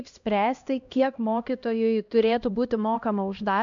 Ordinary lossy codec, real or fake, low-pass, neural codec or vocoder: MP3, 64 kbps; fake; 7.2 kHz; codec, 16 kHz, 1 kbps, X-Codec, HuBERT features, trained on LibriSpeech